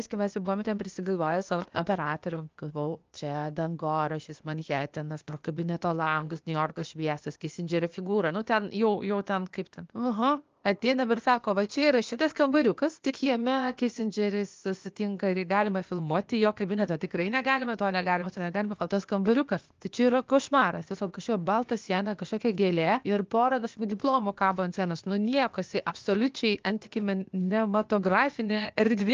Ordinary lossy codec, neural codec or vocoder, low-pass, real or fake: Opus, 24 kbps; codec, 16 kHz, 0.8 kbps, ZipCodec; 7.2 kHz; fake